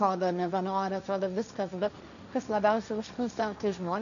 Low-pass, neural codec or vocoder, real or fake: 7.2 kHz; codec, 16 kHz, 1.1 kbps, Voila-Tokenizer; fake